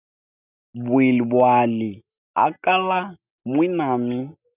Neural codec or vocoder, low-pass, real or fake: none; 3.6 kHz; real